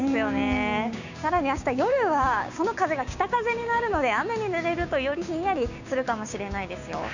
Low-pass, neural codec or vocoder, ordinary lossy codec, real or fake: 7.2 kHz; codec, 16 kHz, 6 kbps, DAC; none; fake